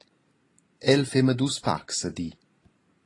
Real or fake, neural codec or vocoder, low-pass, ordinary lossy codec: real; none; 10.8 kHz; AAC, 32 kbps